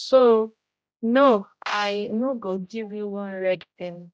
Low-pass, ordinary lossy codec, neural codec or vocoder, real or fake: none; none; codec, 16 kHz, 0.5 kbps, X-Codec, HuBERT features, trained on general audio; fake